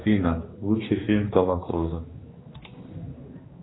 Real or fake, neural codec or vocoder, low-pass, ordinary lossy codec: fake; codec, 16 kHz, 2 kbps, X-Codec, HuBERT features, trained on general audio; 7.2 kHz; AAC, 16 kbps